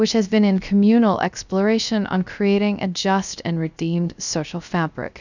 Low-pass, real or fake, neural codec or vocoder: 7.2 kHz; fake; codec, 16 kHz, 0.3 kbps, FocalCodec